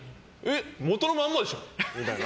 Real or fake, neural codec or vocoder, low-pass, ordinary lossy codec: real; none; none; none